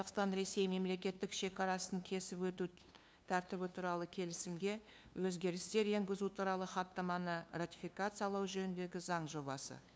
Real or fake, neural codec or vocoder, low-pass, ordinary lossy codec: fake; codec, 16 kHz, 2 kbps, FunCodec, trained on LibriTTS, 25 frames a second; none; none